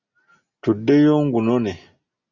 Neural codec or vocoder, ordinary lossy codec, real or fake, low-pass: none; Opus, 64 kbps; real; 7.2 kHz